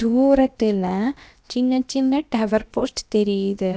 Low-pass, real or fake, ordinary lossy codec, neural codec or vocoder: none; fake; none; codec, 16 kHz, about 1 kbps, DyCAST, with the encoder's durations